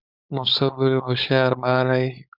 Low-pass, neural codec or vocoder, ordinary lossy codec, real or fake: 5.4 kHz; codec, 16 kHz, 4.8 kbps, FACodec; Opus, 64 kbps; fake